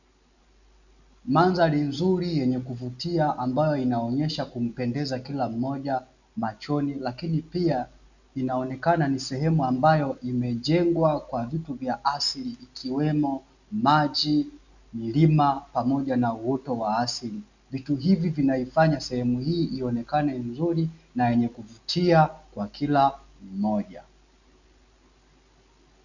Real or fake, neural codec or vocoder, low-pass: real; none; 7.2 kHz